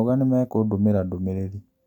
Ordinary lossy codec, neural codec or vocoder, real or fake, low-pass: none; none; real; 19.8 kHz